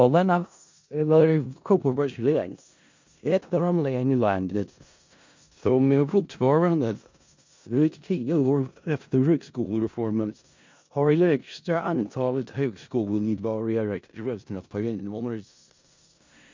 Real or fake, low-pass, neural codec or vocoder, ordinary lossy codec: fake; 7.2 kHz; codec, 16 kHz in and 24 kHz out, 0.4 kbps, LongCat-Audio-Codec, four codebook decoder; MP3, 48 kbps